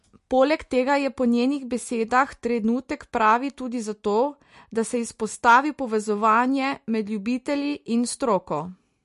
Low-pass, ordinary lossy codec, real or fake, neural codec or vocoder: 14.4 kHz; MP3, 48 kbps; real; none